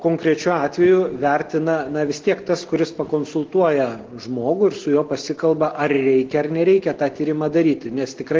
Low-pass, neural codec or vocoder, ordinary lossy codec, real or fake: 7.2 kHz; none; Opus, 16 kbps; real